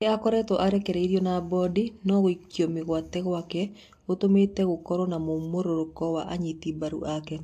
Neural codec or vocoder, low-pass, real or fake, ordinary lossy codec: none; 14.4 kHz; real; AAC, 64 kbps